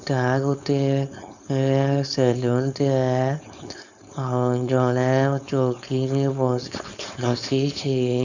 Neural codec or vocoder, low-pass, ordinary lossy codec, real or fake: codec, 16 kHz, 4.8 kbps, FACodec; 7.2 kHz; none; fake